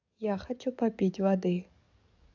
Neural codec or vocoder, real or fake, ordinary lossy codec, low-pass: codec, 44.1 kHz, 7.8 kbps, DAC; fake; MP3, 64 kbps; 7.2 kHz